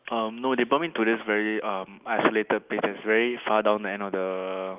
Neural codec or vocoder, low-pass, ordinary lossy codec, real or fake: none; 3.6 kHz; Opus, 24 kbps; real